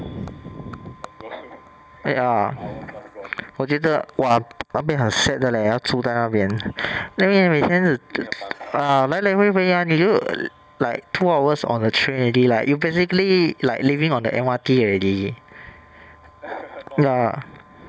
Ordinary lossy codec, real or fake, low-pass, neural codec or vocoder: none; real; none; none